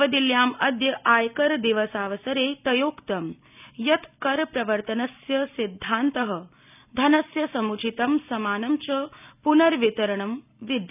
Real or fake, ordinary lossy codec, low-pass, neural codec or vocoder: real; none; 3.6 kHz; none